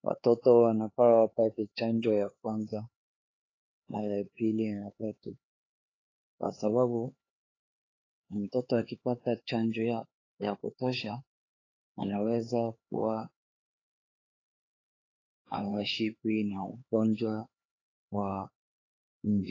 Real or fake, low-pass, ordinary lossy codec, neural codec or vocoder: fake; 7.2 kHz; AAC, 32 kbps; codec, 16 kHz, 4 kbps, X-Codec, HuBERT features, trained on LibriSpeech